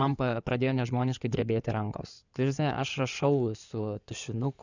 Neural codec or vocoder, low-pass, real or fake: codec, 16 kHz in and 24 kHz out, 2.2 kbps, FireRedTTS-2 codec; 7.2 kHz; fake